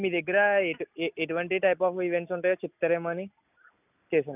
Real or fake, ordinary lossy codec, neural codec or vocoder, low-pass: real; none; none; 3.6 kHz